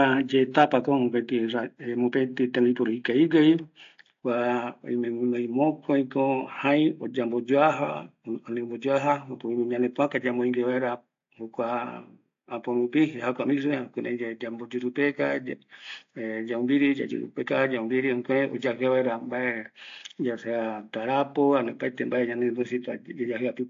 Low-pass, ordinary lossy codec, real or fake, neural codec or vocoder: 7.2 kHz; AAC, 48 kbps; real; none